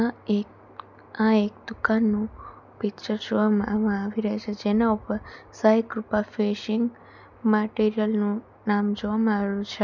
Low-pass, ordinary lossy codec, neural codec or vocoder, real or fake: 7.2 kHz; none; none; real